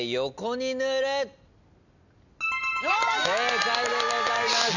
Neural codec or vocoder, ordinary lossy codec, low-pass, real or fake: none; none; 7.2 kHz; real